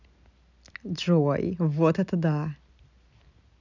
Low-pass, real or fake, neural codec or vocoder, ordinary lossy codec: 7.2 kHz; real; none; none